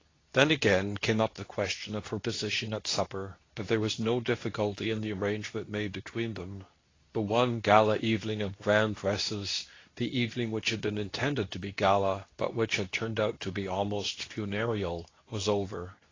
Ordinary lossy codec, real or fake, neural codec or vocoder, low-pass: AAC, 32 kbps; fake; codec, 24 kHz, 0.9 kbps, WavTokenizer, medium speech release version 2; 7.2 kHz